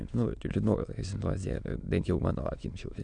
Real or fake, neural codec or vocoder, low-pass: fake; autoencoder, 22.05 kHz, a latent of 192 numbers a frame, VITS, trained on many speakers; 9.9 kHz